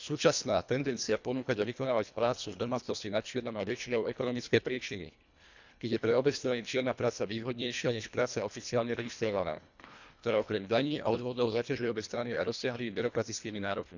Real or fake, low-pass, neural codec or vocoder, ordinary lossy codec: fake; 7.2 kHz; codec, 24 kHz, 1.5 kbps, HILCodec; none